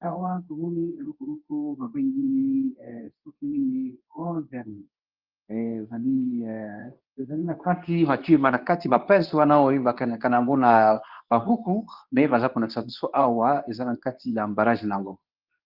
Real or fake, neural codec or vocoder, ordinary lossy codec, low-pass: fake; codec, 24 kHz, 0.9 kbps, WavTokenizer, medium speech release version 2; Opus, 32 kbps; 5.4 kHz